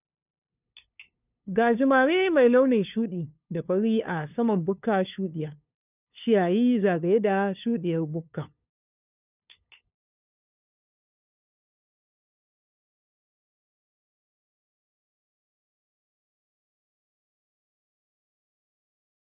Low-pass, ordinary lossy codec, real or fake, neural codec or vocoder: 3.6 kHz; none; fake; codec, 16 kHz, 2 kbps, FunCodec, trained on LibriTTS, 25 frames a second